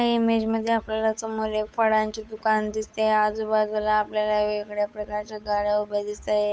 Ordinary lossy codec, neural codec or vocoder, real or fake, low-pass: none; codec, 16 kHz, 8 kbps, FunCodec, trained on Chinese and English, 25 frames a second; fake; none